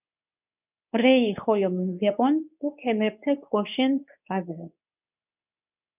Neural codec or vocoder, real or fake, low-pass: codec, 24 kHz, 0.9 kbps, WavTokenizer, medium speech release version 2; fake; 3.6 kHz